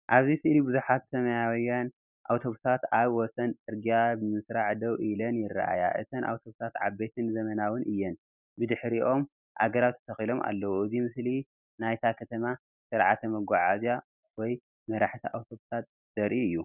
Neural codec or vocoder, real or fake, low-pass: none; real; 3.6 kHz